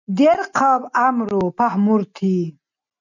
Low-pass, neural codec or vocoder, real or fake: 7.2 kHz; none; real